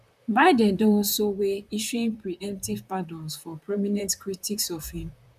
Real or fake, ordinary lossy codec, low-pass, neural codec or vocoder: fake; none; 14.4 kHz; vocoder, 44.1 kHz, 128 mel bands, Pupu-Vocoder